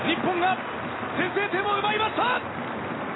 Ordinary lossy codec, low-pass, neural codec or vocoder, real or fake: AAC, 16 kbps; 7.2 kHz; none; real